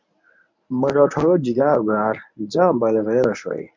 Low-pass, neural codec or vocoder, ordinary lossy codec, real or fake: 7.2 kHz; codec, 24 kHz, 0.9 kbps, WavTokenizer, medium speech release version 1; MP3, 64 kbps; fake